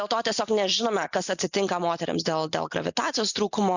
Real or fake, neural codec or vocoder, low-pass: real; none; 7.2 kHz